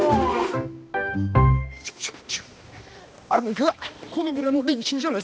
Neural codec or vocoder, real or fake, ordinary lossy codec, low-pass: codec, 16 kHz, 1 kbps, X-Codec, HuBERT features, trained on balanced general audio; fake; none; none